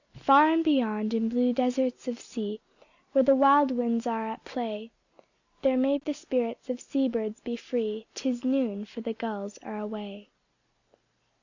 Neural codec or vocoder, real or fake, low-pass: none; real; 7.2 kHz